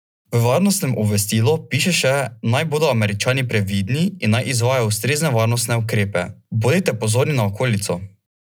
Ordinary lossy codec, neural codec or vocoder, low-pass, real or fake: none; none; none; real